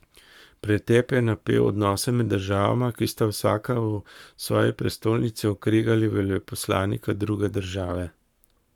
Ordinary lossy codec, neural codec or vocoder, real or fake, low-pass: none; codec, 44.1 kHz, 7.8 kbps, Pupu-Codec; fake; 19.8 kHz